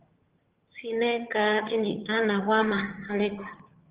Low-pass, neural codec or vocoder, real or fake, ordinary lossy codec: 3.6 kHz; vocoder, 22.05 kHz, 80 mel bands, HiFi-GAN; fake; Opus, 16 kbps